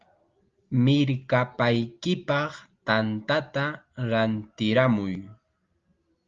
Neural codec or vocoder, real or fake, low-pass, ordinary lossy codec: none; real; 7.2 kHz; Opus, 32 kbps